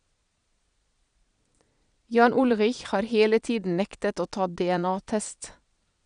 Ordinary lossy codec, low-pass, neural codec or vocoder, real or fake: none; 9.9 kHz; vocoder, 22.05 kHz, 80 mel bands, Vocos; fake